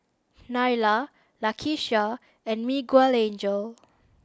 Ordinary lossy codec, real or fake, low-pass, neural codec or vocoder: none; real; none; none